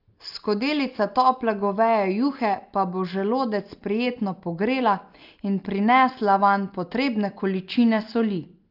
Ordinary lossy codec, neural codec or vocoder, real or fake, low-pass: Opus, 32 kbps; none; real; 5.4 kHz